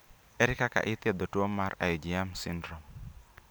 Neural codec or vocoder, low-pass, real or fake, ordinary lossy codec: none; none; real; none